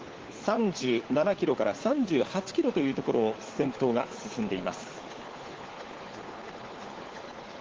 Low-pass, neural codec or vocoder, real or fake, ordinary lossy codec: 7.2 kHz; codec, 16 kHz, 4 kbps, FunCodec, trained on LibriTTS, 50 frames a second; fake; Opus, 16 kbps